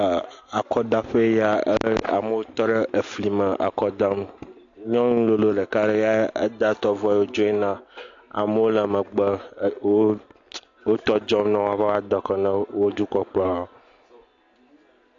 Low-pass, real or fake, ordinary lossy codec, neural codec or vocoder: 7.2 kHz; real; AAC, 48 kbps; none